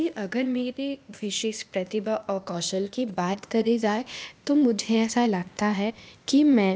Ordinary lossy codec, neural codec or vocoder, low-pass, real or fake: none; codec, 16 kHz, 0.8 kbps, ZipCodec; none; fake